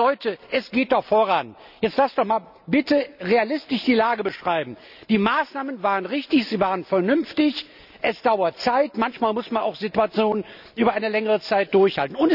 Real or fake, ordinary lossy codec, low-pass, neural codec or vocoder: real; none; 5.4 kHz; none